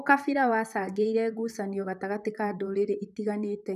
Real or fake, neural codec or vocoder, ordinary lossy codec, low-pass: fake; vocoder, 44.1 kHz, 128 mel bands, Pupu-Vocoder; none; 14.4 kHz